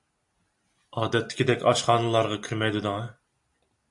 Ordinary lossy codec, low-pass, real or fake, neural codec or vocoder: AAC, 64 kbps; 10.8 kHz; real; none